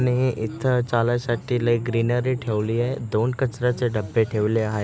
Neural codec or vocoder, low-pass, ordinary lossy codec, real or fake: none; none; none; real